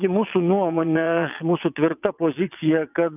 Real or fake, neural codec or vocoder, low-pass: fake; vocoder, 22.05 kHz, 80 mel bands, WaveNeXt; 3.6 kHz